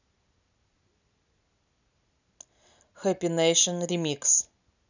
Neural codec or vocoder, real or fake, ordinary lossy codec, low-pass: none; real; none; 7.2 kHz